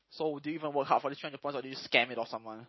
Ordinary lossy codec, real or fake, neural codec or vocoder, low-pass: MP3, 24 kbps; real; none; 7.2 kHz